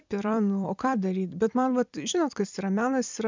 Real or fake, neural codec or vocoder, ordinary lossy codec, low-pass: fake; vocoder, 44.1 kHz, 128 mel bands every 256 samples, BigVGAN v2; MP3, 64 kbps; 7.2 kHz